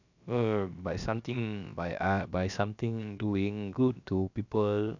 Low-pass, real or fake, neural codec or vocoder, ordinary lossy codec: 7.2 kHz; fake; codec, 16 kHz, about 1 kbps, DyCAST, with the encoder's durations; none